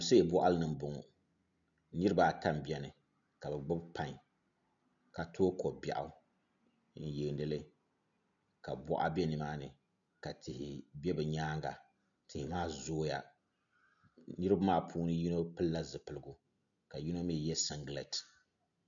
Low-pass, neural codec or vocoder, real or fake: 7.2 kHz; none; real